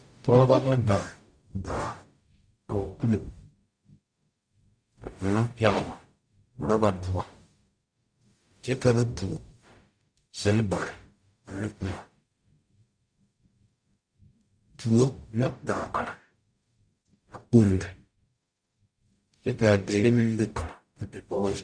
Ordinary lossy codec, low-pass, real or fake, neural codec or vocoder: Opus, 64 kbps; 9.9 kHz; fake; codec, 44.1 kHz, 0.9 kbps, DAC